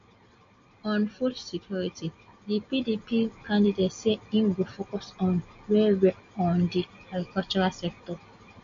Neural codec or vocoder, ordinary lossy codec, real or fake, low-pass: none; MP3, 48 kbps; real; 7.2 kHz